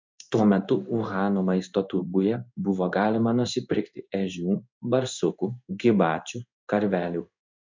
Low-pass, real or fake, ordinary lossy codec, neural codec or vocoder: 7.2 kHz; fake; MP3, 64 kbps; codec, 16 kHz in and 24 kHz out, 1 kbps, XY-Tokenizer